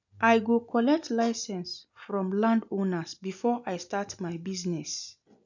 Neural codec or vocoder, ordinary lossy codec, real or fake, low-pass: none; none; real; 7.2 kHz